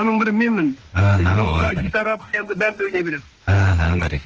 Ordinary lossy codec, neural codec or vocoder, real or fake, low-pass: Opus, 16 kbps; autoencoder, 48 kHz, 32 numbers a frame, DAC-VAE, trained on Japanese speech; fake; 7.2 kHz